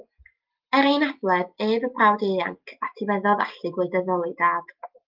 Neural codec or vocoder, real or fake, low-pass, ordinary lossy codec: none; real; 5.4 kHz; Opus, 32 kbps